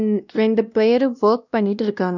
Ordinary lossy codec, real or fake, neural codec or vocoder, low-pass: none; fake; codec, 16 kHz, 1 kbps, X-Codec, WavLM features, trained on Multilingual LibriSpeech; 7.2 kHz